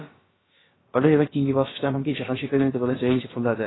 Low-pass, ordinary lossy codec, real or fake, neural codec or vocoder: 7.2 kHz; AAC, 16 kbps; fake; codec, 16 kHz, about 1 kbps, DyCAST, with the encoder's durations